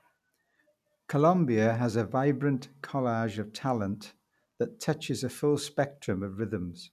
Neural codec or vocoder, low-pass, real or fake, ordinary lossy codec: none; 14.4 kHz; real; none